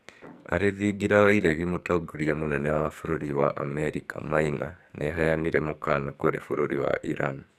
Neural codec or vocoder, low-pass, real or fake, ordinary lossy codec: codec, 44.1 kHz, 2.6 kbps, SNAC; 14.4 kHz; fake; none